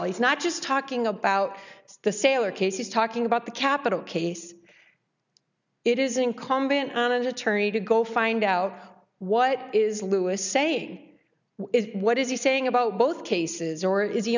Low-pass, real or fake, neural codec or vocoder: 7.2 kHz; real; none